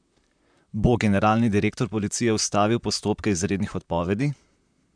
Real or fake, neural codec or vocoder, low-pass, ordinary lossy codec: fake; vocoder, 44.1 kHz, 128 mel bands, Pupu-Vocoder; 9.9 kHz; none